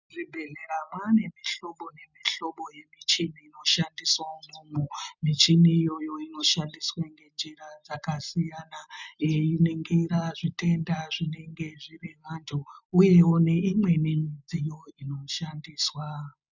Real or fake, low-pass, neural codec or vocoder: real; 7.2 kHz; none